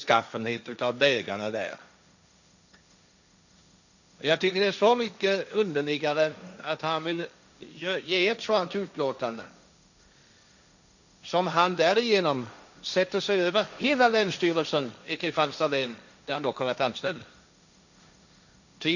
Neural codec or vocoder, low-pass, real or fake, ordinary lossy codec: codec, 16 kHz, 1.1 kbps, Voila-Tokenizer; 7.2 kHz; fake; none